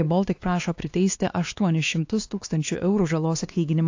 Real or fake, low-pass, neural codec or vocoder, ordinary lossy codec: fake; 7.2 kHz; codec, 16 kHz, 2 kbps, X-Codec, WavLM features, trained on Multilingual LibriSpeech; AAC, 48 kbps